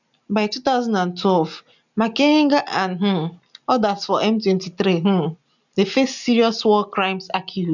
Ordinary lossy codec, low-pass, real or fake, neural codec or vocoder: none; 7.2 kHz; real; none